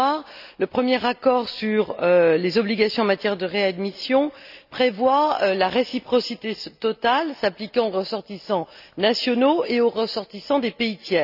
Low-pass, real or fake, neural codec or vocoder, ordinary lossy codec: 5.4 kHz; real; none; none